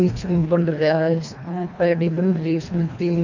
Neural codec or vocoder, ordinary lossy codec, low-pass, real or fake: codec, 24 kHz, 1.5 kbps, HILCodec; none; 7.2 kHz; fake